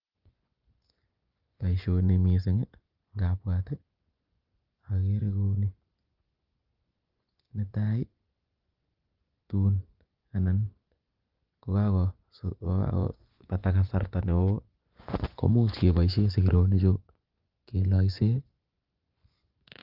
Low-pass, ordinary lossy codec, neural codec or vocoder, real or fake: 5.4 kHz; Opus, 32 kbps; vocoder, 24 kHz, 100 mel bands, Vocos; fake